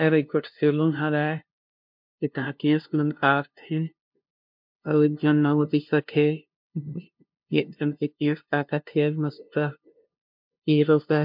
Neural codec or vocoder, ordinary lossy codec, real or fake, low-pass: codec, 16 kHz, 0.5 kbps, FunCodec, trained on LibriTTS, 25 frames a second; MP3, 48 kbps; fake; 5.4 kHz